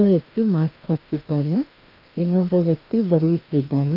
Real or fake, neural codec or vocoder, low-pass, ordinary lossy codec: fake; codec, 16 kHz, 1 kbps, FreqCodec, larger model; 5.4 kHz; Opus, 24 kbps